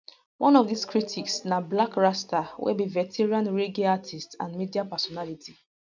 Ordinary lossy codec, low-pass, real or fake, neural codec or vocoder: none; 7.2 kHz; real; none